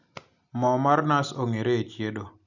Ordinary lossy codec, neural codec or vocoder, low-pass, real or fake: none; none; 7.2 kHz; real